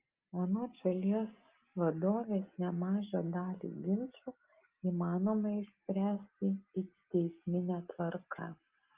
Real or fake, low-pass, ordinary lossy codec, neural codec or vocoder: real; 3.6 kHz; Opus, 32 kbps; none